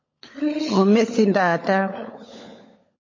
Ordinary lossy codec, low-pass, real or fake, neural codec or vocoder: MP3, 32 kbps; 7.2 kHz; fake; codec, 16 kHz, 16 kbps, FunCodec, trained on LibriTTS, 50 frames a second